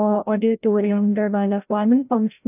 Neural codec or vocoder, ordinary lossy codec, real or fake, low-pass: codec, 16 kHz, 0.5 kbps, FreqCodec, larger model; none; fake; 3.6 kHz